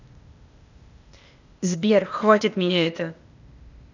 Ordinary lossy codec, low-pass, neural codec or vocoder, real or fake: none; 7.2 kHz; codec, 16 kHz, 0.8 kbps, ZipCodec; fake